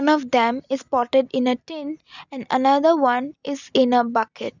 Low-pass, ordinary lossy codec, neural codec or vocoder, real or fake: 7.2 kHz; none; none; real